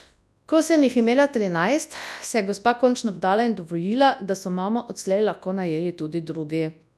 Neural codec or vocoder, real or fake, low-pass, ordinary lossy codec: codec, 24 kHz, 0.9 kbps, WavTokenizer, large speech release; fake; none; none